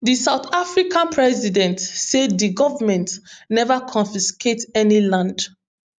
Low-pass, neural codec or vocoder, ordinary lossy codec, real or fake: 9.9 kHz; none; none; real